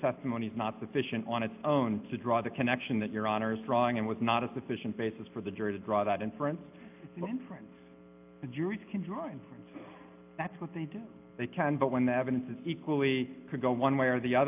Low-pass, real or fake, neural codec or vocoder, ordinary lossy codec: 3.6 kHz; real; none; AAC, 32 kbps